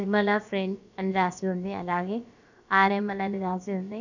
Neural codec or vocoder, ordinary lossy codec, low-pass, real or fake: codec, 16 kHz, about 1 kbps, DyCAST, with the encoder's durations; none; 7.2 kHz; fake